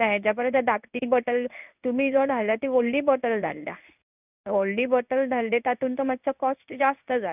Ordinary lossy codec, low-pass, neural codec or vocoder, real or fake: none; 3.6 kHz; codec, 16 kHz in and 24 kHz out, 1 kbps, XY-Tokenizer; fake